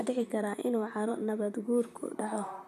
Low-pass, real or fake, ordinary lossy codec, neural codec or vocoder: 14.4 kHz; fake; none; vocoder, 44.1 kHz, 128 mel bands, Pupu-Vocoder